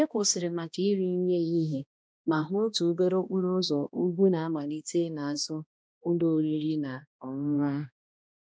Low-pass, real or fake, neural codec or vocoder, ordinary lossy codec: none; fake; codec, 16 kHz, 1 kbps, X-Codec, HuBERT features, trained on balanced general audio; none